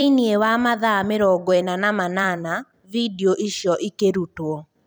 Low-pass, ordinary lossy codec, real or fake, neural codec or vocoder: none; none; fake; vocoder, 44.1 kHz, 128 mel bands every 256 samples, BigVGAN v2